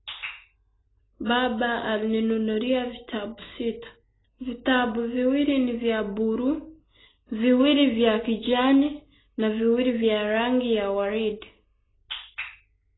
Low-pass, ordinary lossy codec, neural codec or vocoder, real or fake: 7.2 kHz; AAC, 16 kbps; none; real